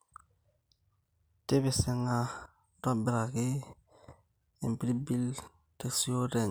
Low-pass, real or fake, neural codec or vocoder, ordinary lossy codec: none; real; none; none